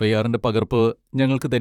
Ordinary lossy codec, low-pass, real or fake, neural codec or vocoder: none; 19.8 kHz; fake; vocoder, 44.1 kHz, 128 mel bands, Pupu-Vocoder